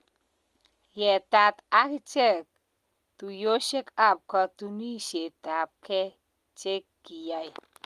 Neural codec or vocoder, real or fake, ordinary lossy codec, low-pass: none; real; Opus, 32 kbps; 14.4 kHz